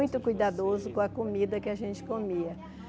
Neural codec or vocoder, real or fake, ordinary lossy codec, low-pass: none; real; none; none